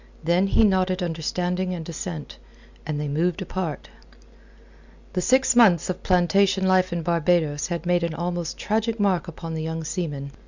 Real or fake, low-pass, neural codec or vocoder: real; 7.2 kHz; none